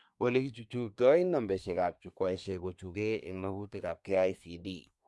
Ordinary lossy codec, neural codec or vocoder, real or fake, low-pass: none; codec, 24 kHz, 1 kbps, SNAC; fake; none